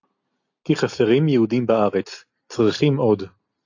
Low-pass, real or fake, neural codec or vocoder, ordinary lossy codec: 7.2 kHz; real; none; AAC, 48 kbps